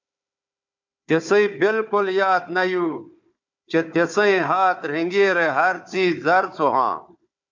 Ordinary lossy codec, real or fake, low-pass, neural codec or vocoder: MP3, 64 kbps; fake; 7.2 kHz; codec, 16 kHz, 4 kbps, FunCodec, trained on Chinese and English, 50 frames a second